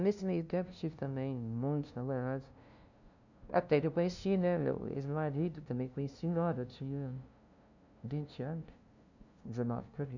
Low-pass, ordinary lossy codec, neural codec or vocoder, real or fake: 7.2 kHz; none; codec, 16 kHz, 0.5 kbps, FunCodec, trained on LibriTTS, 25 frames a second; fake